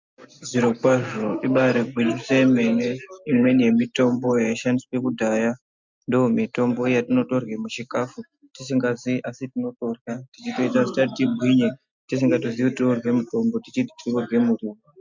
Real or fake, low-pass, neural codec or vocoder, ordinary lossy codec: real; 7.2 kHz; none; MP3, 64 kbps